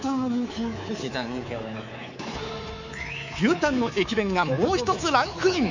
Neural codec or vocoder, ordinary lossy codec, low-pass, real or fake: codec, 24 kHz, 3.1 kbps, DualCodec; none; 7.2 kHz; fake